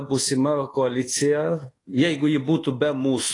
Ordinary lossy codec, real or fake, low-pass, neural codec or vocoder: AAC, 32 kbps; fake; 10.8 kHz; codec, 24 kHz, 1.2 kbps, DualCodec